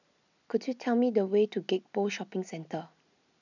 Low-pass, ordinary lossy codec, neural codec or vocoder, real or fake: 7.2 kHz; none; none; real